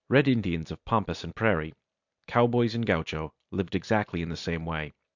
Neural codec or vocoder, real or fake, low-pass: vocoder, 44.1 kHz, 128 mel bands every 256 samples, BigVGAN v2; fake; 7.2 kHz